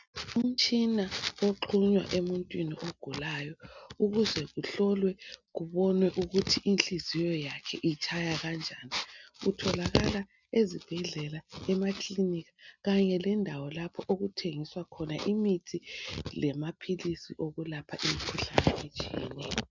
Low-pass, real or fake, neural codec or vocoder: 7.2 kHz; real; none